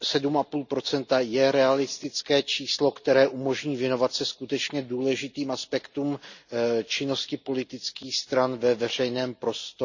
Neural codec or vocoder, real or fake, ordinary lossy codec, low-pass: none; real; none; 7.2 kHz